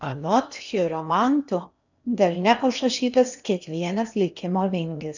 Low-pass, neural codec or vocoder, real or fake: 7.2 kHz; codec, 16 kHz in and 24 kHz out, 0.8 kbps, FocalCodec, streaming, 65536 codes; fake